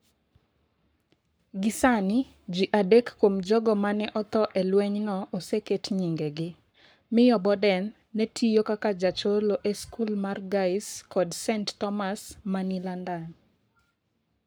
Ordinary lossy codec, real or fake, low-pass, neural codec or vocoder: none; fake; none; codec, 44.1 kHz, 7.8 kbps, Pupu-Codec